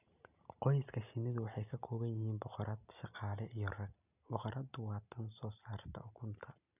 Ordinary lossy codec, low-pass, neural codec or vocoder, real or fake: none; 3.6 kHz; none; real